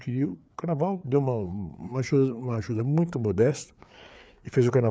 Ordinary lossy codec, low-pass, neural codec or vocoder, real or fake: none; none; codec, 16 kHz, 4 kbps, FreqCodec, larger model; fake